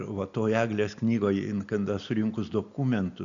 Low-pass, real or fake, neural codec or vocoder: 7.2 kHz; real; none